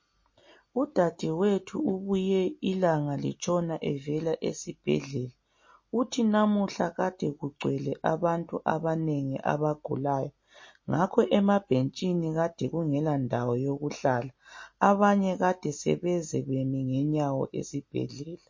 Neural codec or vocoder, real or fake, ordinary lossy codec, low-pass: none; real; MP3, 32 kbps; 7.2 kHz